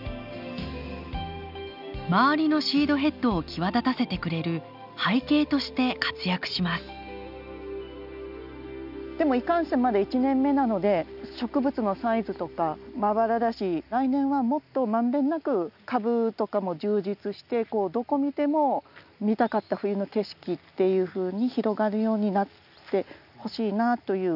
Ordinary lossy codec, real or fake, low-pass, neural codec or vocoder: none; real; 5.4 kHz; none